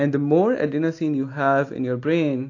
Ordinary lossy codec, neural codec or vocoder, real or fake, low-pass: AAC, 48 kbps; none; real; 7.2 kHz